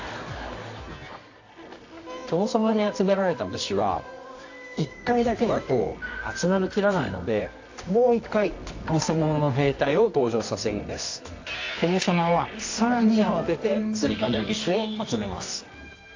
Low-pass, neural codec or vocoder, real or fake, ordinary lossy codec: 7.2 kHz; codec, 24 kHz, 0.9 kbps, WavTokenizer, medium music audio release; fake; AAC, 48 kbps